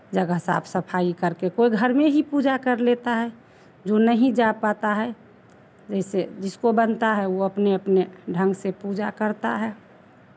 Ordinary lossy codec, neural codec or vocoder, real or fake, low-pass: none; none; real; none